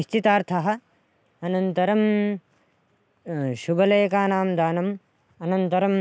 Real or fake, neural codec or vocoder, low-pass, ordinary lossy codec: real; none; none; none